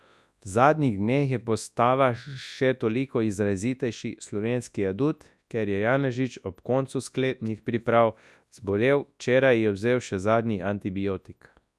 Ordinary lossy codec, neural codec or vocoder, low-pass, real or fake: none; codec, 24 kHz, 0.9 kbps, WavTokenizer, large speech release; none; fake